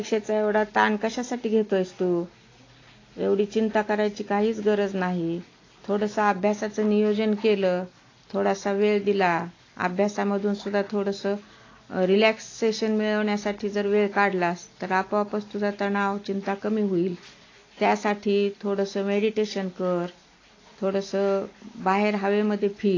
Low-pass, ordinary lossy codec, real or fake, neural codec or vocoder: 7.2 kHz; AAC, 32 kbps; real; none